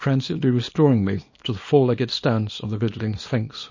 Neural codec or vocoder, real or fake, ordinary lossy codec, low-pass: codec, 24 kHz, 0.9 kbps, WavTokenizer, small release; fake; MP3, 32 kbps; 7.2 kHz